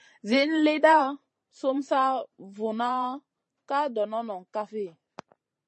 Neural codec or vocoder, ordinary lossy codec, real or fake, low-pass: vocoder, 44.1 kHz, 128 mel bands every 512 samples, BigVGAN v2; MP3, 32 kbps; fake; 10.8 kHz